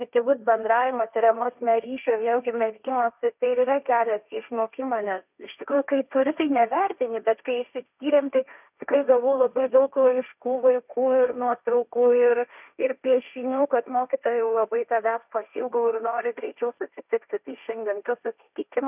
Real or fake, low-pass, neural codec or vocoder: fake; 3.6 kHz; codec, 16 kHz, 1.1 kbps, Voila-Tokenizer